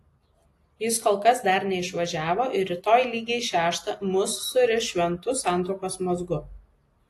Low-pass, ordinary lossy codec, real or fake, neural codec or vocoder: 14.4 kHz; AAC, 48 kbps; real; none